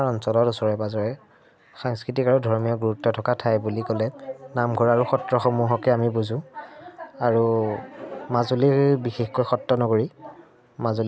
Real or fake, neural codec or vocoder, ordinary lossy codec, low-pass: real; none; none; none